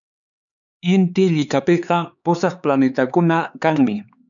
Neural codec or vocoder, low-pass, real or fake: codec, 16 kHz, 4 kbps, X-Codec, HuBERT features, trained on LibriSpeech; 7.2 kHz; fake